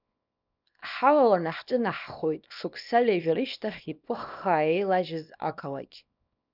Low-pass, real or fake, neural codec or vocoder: 5.4 kHz; fake; codec, 24 kHz, 0.9 kbps, WavTokenizer, small release